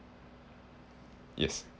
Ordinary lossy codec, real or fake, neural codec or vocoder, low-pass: none; real; none; none